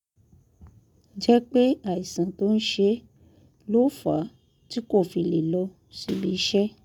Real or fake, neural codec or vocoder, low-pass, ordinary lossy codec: real; none; 19.8 kHz; none